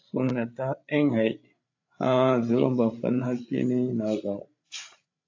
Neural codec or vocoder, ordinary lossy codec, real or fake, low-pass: codec, 16 kHz, 8 kbps, FreqCodec, larger model; AAC, 48 kbps; fake; 7.2 kHz